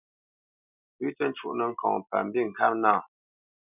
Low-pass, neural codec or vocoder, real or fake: 3.6 kHz; none; real